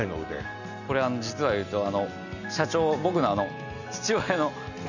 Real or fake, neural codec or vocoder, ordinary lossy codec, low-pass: real; none; none; 7.2 kHz